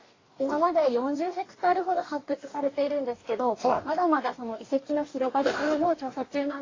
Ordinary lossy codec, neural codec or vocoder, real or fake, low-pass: AAC, 32 kbps; codec, 44.1 kHz, 2.6 kbps, DAC; fake; 7.2 kHz